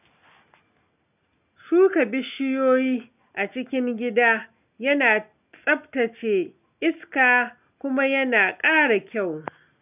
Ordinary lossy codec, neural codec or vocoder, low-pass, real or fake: none; none; 3.6 kHz; real